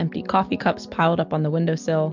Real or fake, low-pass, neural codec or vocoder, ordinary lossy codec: real; 7.2 kHz; none; MP3, 64 kbps